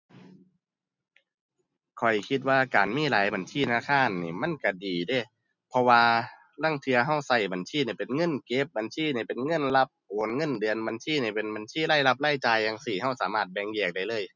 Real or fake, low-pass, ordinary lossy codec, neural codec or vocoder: real; 7.2 kHz; none; none